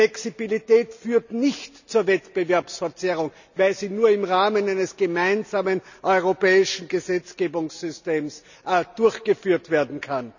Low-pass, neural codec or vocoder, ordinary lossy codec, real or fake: 7.2 kHz; none; none; real